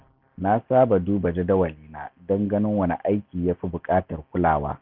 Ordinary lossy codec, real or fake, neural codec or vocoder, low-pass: none; real; none; 5.4 kHz